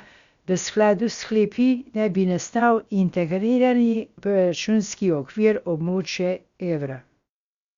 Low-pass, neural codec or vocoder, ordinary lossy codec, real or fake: 7.2 kHz; codec, 16 kHz, about 1 kbps, DyCAST, with the encoder's durations; Opus, 64 kbps; fake